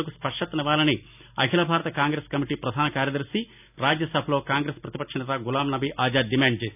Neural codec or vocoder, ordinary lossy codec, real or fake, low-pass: none; none; real; 3.6 kHz